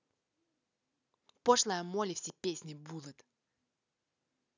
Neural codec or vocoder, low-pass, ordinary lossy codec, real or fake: none; 7.2 kHz; none; real